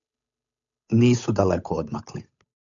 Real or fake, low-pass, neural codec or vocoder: fake; 7.2 kHz; codec, 16 kHz, 8 kbps, FunCodec, trained on Chinese and English, 25 frames a second